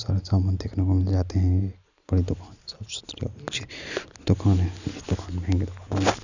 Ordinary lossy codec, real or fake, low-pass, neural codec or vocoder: none; real; 7.2 kHz; none